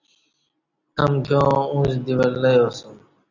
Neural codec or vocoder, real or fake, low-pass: none; real; 7.2 kHz